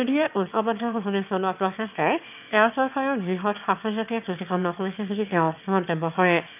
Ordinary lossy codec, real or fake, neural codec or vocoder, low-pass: none; fake; autoencoder, 22.05 kHz, a latent of 192 numbers a frame, VITS, trained on one speaker; 3.6 kHz